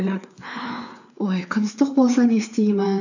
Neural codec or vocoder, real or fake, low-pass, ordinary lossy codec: codec, 16 kHz, 4 kbps, FreqCodec, larger model; fake; 7.2 kHz; none